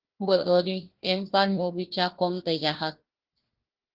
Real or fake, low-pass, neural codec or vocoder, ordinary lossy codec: fake; 5.4 kHz; codec, 16 kHz, 1 kbps, FunCodec, trained on Chinese and English, 50 frames a second; Opus, 16 kbps